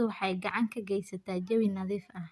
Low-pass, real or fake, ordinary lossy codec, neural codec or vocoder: none; real; none; none